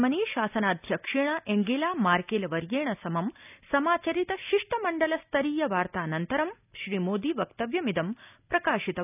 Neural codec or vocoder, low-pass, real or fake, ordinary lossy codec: none; 3.6 kHz; real; none